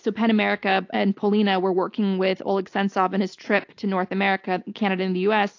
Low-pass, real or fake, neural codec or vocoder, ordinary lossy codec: 7.2 kHz; real; none; AAC, 48 kbps